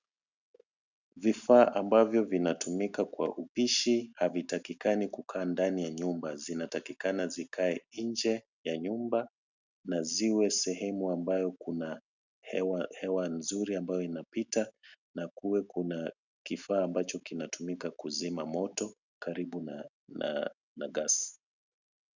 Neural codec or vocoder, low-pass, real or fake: none; 7.2 kHz; real